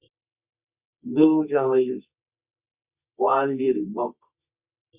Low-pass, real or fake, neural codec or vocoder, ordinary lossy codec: 3.6 kHz; fake; codec, 24 kHz, 0.9 kbps, WavTokenizer, medium music audio release; Opus, 64 kbps